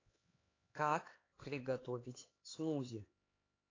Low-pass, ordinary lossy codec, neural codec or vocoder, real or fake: 7.2 kHz; AAC, 32 kbps; codec, 16 kHz, 4 kbps, X-Codec, HuBERT features, trained on LibriSpeech; fake